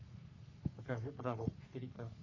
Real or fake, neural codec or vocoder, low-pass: fake; codec, 44.1 kHz, 3.4 kbps, Pupu-Codec; 7.2 kHz